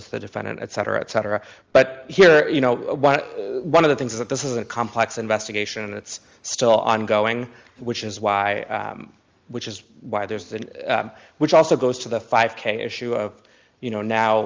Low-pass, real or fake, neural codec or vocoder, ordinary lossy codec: 7.2 kHz; real; none; Opus, 32 kbps